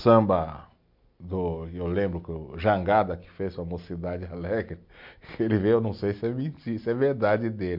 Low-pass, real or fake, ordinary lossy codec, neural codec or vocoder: 5.4 kHz; real; MP3, 48 kbps; none